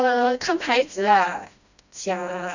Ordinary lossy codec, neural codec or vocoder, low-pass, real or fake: none; codec, 16 kHz, 1 kbps, FreqCodec, smaller model; 7.2 kHz; fake